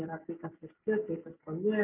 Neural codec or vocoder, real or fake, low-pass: none; real; 3.6 kHz